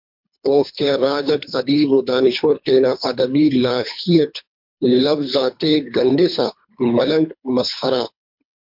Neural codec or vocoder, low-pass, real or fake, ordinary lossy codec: codec, 24 kHz, 3 kbps, HILCodec; 5.4 kHz; fake; MP3, 48 kbps